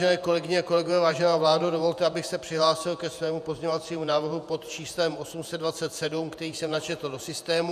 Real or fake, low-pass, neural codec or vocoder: fake; 14.4 kHz; vocoder, 48 kHz, 128 mel bands, Vocos